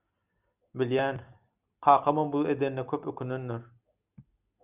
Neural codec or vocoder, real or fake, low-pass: none; real; 3.6 kHz